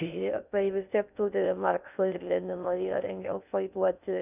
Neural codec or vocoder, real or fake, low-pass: codec, 16 kHz in and 24 kHz out, 0.6 kbps, FocalCodec, streaming, 2048 codes; fake; 3.6 kHz